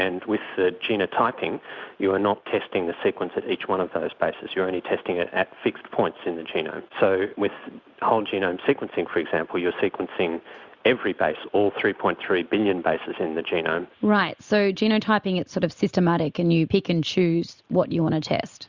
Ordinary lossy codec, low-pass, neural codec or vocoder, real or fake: Opus, 64 kbps; 7.2 kHz; none; real